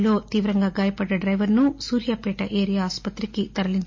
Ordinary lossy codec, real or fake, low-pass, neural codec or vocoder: MP3, 32 kbps; real; 7.2 kHz; none